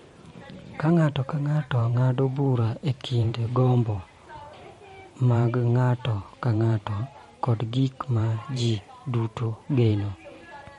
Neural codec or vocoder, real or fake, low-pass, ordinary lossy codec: vocoder, 44.1 kHz, 128 mel bands every 512 samples, BigVGAN v2; fake; 19.8 kHz; MP3, 48 kbps